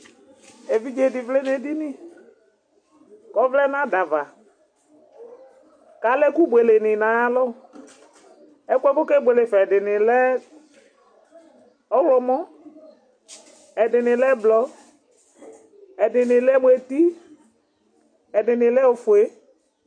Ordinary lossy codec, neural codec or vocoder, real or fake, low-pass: MP3, 48 kbps; none; real; 9.9 kHz